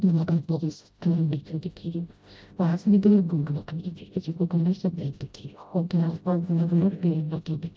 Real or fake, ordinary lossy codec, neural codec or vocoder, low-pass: fake; none; codec, 16 kHz, 0.5 kbps, FreqCodec, smaller model; none